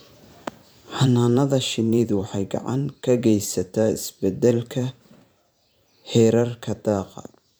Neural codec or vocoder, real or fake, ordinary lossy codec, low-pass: vocoder, 44.1 kHz, 128 mel bands every 512 samples, BigVGAN v2; fake; none; none